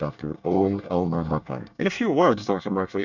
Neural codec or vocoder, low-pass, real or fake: codec, 24 kHz, 1 kbps, SNAC; 7.2 kHz; fake